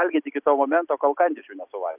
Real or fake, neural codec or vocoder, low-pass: real; none; 3.6 kHz